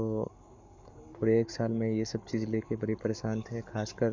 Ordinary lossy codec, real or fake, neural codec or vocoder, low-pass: MP3, 64 kbps; fake; codec, 44.1 kHz, 7.8 kbps, Pupu-Codec; 7.2 kHz